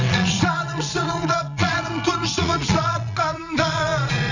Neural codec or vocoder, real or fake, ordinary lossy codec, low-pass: vocoder, 22.05 kHz, 80 mel bands, Vocos; fake; none; 7.2 kHz